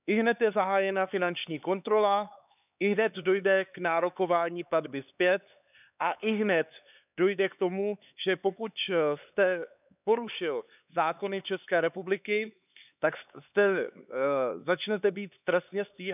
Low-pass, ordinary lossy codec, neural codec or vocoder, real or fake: 3.6 kHz; none; codec, 16 kHz, 4 kbps, X-Codec, HuBERT features, trained on LibriSpeech; fake